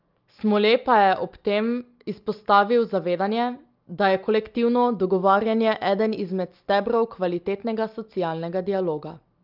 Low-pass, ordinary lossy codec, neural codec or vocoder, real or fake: 5.4 kHz; Opus, 24 kbps; none; real